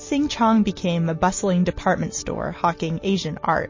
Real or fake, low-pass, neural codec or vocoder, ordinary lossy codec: real; 7.2 kHz; none; MP3, 32 kbps